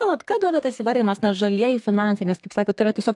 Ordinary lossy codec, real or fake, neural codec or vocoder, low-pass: AAC, 64 kbps; fake; codec, 32 kHz, 1.9 kbps, SNAC; 10.8 kHz